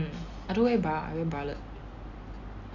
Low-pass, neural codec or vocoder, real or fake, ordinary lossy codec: 7.2 kHz; none; real; none